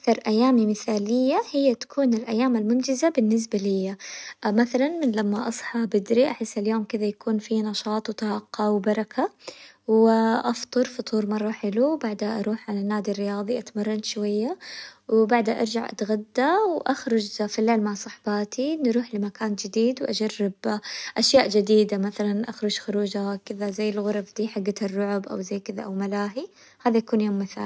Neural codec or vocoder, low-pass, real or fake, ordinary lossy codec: none; none; real; none